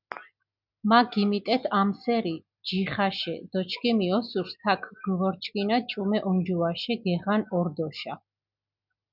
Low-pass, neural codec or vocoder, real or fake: 5.4 kHz; none; real